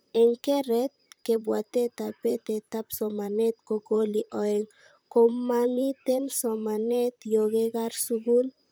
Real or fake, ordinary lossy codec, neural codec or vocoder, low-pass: fake; none; vocoder, 44.1 kHz, 128 mel bands, Pupu-Vocoder; none